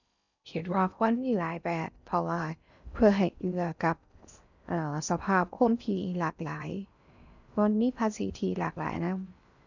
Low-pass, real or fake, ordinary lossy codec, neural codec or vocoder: 7.2 kHz; fake; none; codec, 16 kHz in and 24 kHz out, 0.6 kbps, FocalCodec, streaming, 4096 codes